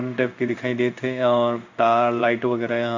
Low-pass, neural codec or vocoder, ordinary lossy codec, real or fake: 7.2 kHz; codec, 16 kHz in and 24 kHz out, 1 kbps, XY-Tokenizer; MP3, 64 kbps; fake